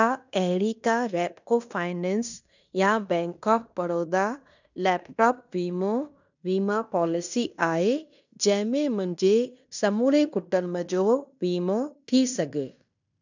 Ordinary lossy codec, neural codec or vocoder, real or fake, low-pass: none; codec, 16 kHz in and 24 kHz out, 0.9 kbps, LongCat-Audio-Codec, fine tuned four codebook decoder; fake; 7.2 kHz